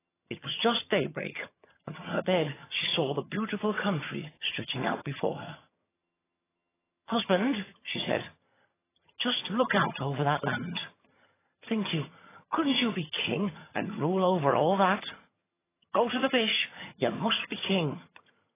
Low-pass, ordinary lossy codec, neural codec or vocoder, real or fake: 3.6 kHz; AAC, 16 kbps; vocoder, 22.05 kHz, 80 mel bands, HiFi-GAN; fake